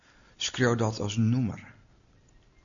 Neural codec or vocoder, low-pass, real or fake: none; 7.2 kHz; real